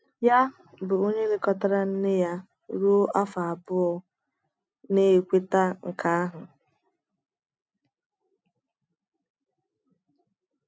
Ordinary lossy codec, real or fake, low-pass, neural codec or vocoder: none; real; none; none